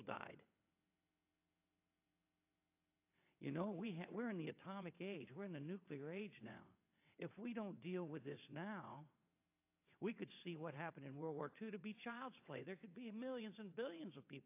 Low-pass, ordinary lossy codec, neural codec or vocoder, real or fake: 3.6 kHz; AAC, 32 kbps; vocoder, 22.05 kHz, 80 mel bands, WaveNeXt; fake